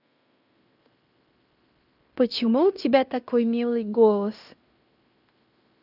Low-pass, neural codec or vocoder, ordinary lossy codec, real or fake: 5.4 kHz; codec, 16 kHz in and 24 kHz out, 0.9 kbps, LongCat-Audio-Codec, fine tuned four codebook decoder; none; fake